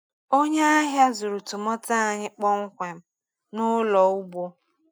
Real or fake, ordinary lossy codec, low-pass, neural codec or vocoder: real; none; none; none